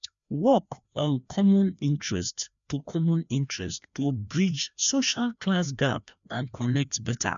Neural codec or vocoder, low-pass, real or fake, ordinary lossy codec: codec, 16 kHz, 1 kbps, FreqCodec, larger model; 7.2 kHz; fake; none